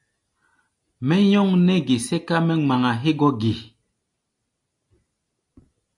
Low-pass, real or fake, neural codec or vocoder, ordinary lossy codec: 10.8 kHz; real; none; AAC, 64 kbps